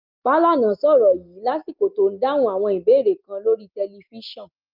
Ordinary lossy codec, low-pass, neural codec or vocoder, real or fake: Opus, 24 kbps; 5.4 kHz; none; real